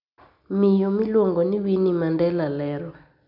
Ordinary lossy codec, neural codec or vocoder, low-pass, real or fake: none; none; 5.4 kHz; real